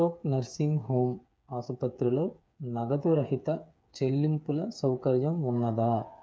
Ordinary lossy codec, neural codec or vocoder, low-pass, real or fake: none; codec, 16 kHz, 8 kbps, FreqCodec, smaller model; none; fake